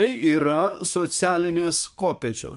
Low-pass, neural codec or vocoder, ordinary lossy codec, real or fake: 10.8 kHz; codec, 24 kHz, 1 kbps, SNAC; AAC, 96 kbps; fake